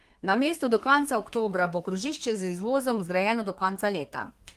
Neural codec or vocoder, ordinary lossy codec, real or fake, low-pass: codec, 32 kHz, 1.9 kbps, SNAC; Opus, 24 kbps; fake; 14.4 kHz